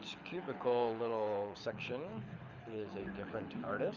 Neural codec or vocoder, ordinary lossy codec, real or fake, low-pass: codec, 24 kHz, 6 kbps, HILCodec; Opus, 64 kbps; fake; 7.2 kHz